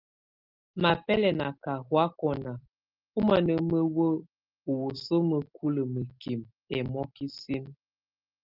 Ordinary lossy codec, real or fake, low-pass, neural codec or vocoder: Opus, 24 kbps; real; 5.4 kHz; none